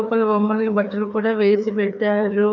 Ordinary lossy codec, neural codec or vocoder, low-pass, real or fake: none; codec, 16 kHz, 2 kbps, FreqCodec, larger model; 7.2 kHz; fake